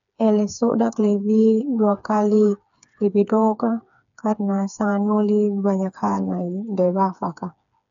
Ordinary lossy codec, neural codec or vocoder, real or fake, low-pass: none; codec, 16 kHz, 4 kbps, FreqCodec, smaller model; fake; 7.2 kHz